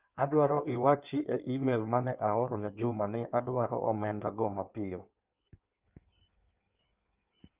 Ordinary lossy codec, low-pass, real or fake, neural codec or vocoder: Opus, 24 kbps; 3.6 kHz; fake; codec, 16 kHz in and 24 kHz out, 1.1 kbps, FireRedTTS-2 codec